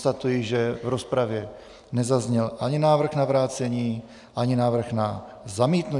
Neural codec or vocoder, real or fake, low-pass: none; real; 10.8 kHz